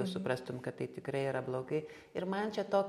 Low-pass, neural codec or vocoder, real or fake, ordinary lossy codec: 19.8 kHz; none; real; MP3, 64 kbps